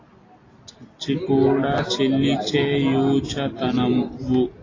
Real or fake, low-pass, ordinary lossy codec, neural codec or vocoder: real; 7.2 kHz; AAC, 32 kbps; none